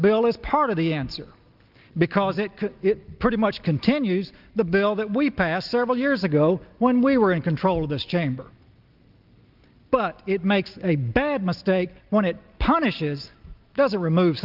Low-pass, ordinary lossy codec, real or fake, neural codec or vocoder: 5.4 kHz; Opus, 32 kbps; real; none